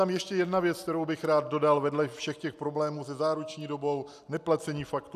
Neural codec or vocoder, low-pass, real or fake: none; 14.4 kHz; real